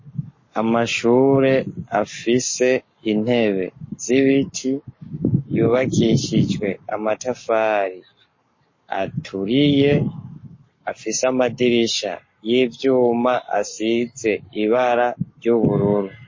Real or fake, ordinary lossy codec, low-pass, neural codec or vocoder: fake; MP3, 32 kbps; 7.2 kHz; codec, 44.1 kHz, 7.8 kbps, Pupu-Codec